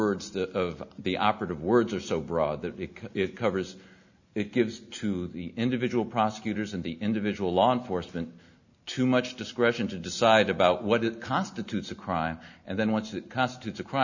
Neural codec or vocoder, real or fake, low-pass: none; real; 7.2 kHz